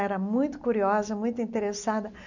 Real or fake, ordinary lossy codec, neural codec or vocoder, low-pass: real; none; none; 7.2 kHz